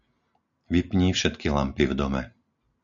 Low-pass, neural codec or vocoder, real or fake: 7.2 kHz; none; real